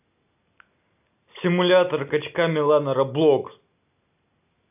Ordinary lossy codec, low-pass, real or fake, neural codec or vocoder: none; 3.6 kHz; real; none